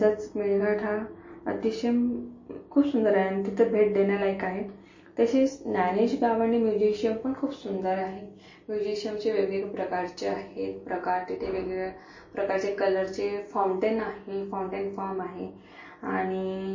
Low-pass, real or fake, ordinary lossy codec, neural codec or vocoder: 7.2 kHz; real; MP3, 32 kbps; none